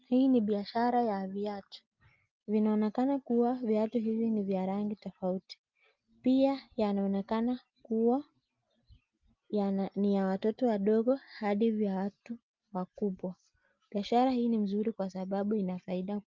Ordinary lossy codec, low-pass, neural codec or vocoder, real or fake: Opus, 32 kbps; 7.2 kHz; none; real